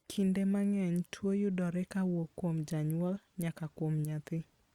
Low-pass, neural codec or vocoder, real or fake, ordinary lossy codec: 19.8 kHz; none; real; Opus, 64 kbps